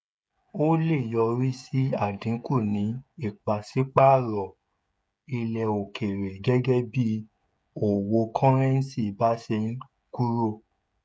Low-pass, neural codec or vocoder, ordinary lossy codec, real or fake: none; codec, 16 kHz, 16 kbps, FreqCodec, smaller model; none; fake